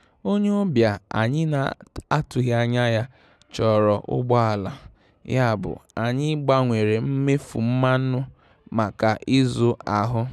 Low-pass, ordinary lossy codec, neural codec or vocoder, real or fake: none; none; none; real